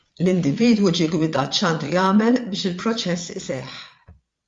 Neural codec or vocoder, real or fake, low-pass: vocoder, 22.05 kHz, 80 mel bands, Vocos; fake; 9.9 kHz